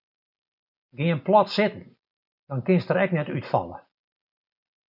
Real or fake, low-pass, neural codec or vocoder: real; 5.4 kHz; none